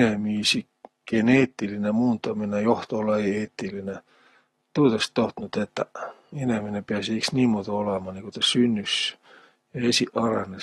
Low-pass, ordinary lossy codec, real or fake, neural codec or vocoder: 19.8 kHz; AAC, 32 kbps; real; none